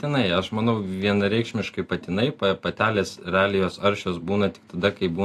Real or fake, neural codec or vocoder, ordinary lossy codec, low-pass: real; none; AAC, 64 kbps; 14.4 kHz